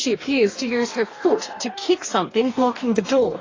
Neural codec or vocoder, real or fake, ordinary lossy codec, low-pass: codec, 44.1 kHz, 2.6 kbps, DAC; fake; AAC, 32 kbps; 7.2 kHz